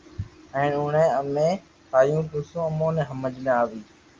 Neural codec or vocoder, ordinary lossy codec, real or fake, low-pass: none; Opus, 24 kbps; real; 7.2 kHz